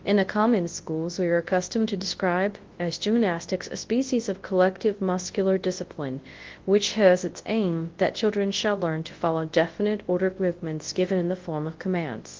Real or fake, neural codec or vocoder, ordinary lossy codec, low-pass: fake; codec, 24 kHz, 0.9 kbps, WavTokenizer, large speech release; Opus, 16 kbps; 7.2 kHz